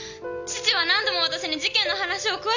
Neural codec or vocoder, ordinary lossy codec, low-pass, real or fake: none; none; 7.2 kHz; real